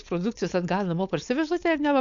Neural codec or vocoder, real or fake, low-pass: codec, 16 kHz, 4.8 kbps, FACodec; fake; 7.2 kHz